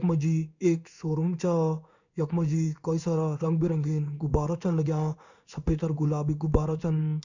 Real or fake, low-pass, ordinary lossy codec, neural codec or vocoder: fake; 7.2 kHz; none; codec, 16 kHz in and 24 kHz out, 1 kbps, XY-Tokenizer